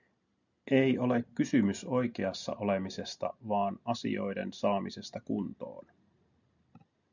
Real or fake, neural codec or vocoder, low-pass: real; none; 7.2 kHz